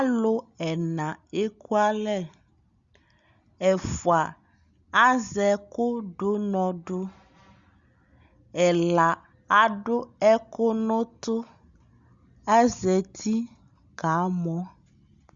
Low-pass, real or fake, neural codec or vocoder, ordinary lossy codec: 7.2 kHz; real; none; Opus, 64 kbps